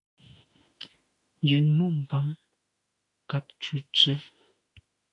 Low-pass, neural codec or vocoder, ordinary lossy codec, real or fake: 10.8 kHz; autoencoder, 48 kHz, 32 numbers a frame, DAC-VAE, trained on Japanese speech; AAC, 48 kbps; fake